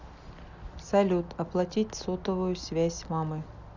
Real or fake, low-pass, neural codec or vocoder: real; 7.2 kHz; none